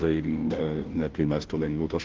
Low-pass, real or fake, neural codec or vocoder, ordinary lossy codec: 7.2 kHz; fake; codec, 16 kHz, 0.5 kbps, FunCodec, trained on Chinese and English, 25 frames a second; Opus, 16 kbps